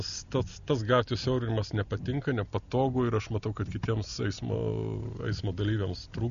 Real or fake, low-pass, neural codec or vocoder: real; 7.2 kHz; none